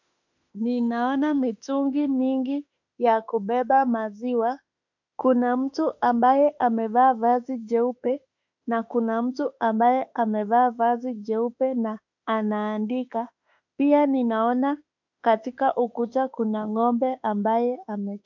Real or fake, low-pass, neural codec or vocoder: fake; 7.2 kHz; autoencoder, 48 kHz, 32 numbers a frame, DAC-VAE, trained on Japanese speech